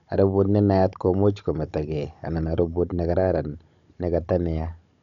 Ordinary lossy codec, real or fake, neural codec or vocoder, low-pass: none; fake; codec, 16 kHz, 16 kbps, FunCodec, trained on Chinese and English, 50 frames a second; 7.2 kHz